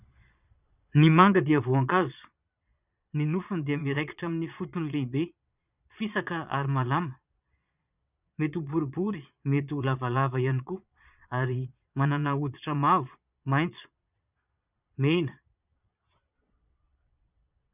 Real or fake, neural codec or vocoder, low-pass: fake; vocoder, 22.05 kHz, 80 mel bands, Vocos; 3.6 kHz